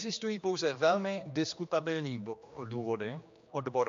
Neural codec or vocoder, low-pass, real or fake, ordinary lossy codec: codec, 16 kHz, 2 kbps, X-Codec, HuBERT features, trained on general audio; 7.2 kHz; fake; MP3, 48 kbps